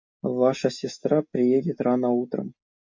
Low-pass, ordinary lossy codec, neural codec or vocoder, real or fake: 7.2 kHz; MP3, 48 kbps; none; real